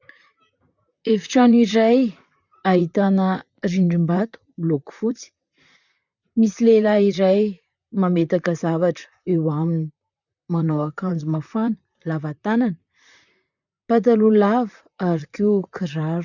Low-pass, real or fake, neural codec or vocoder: 7.2 kHz; fake; vocoder, 44.1 kHz, 128 mel bands, Pupu-Vocoder